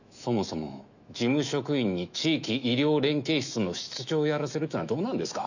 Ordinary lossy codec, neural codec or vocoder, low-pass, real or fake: none; autoencoder, 48 kHz, 128 numbers a frame, DAC-VAE, trained on Japanese speech; 7.2 kHz; fake